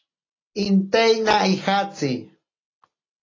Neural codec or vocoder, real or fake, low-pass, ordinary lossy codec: none; real; 7.2 kHz; AAC, 32 kbps